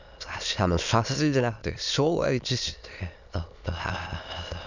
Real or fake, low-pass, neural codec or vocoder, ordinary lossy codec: fake; 7.2 kHz; autoencoder, 22.05 kHz, a latent of 192 numbers a frame, VITS, trained on many speakers; none